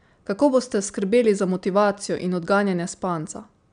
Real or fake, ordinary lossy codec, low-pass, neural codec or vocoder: real; none; 9.9 kHz; none